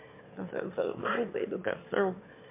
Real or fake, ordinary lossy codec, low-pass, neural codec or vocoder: fake; MP3, 24 kbps; 3.6 kHz; autoencoder, 22.05 kHz, a latent of 192 numbers a frame, VITS, trained on one speaker